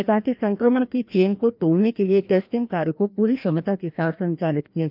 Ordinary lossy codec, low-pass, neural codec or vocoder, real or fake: none; 5.4 kHz; codec, 16 kHz, 1 kbps, FreqCodec, larger model; fake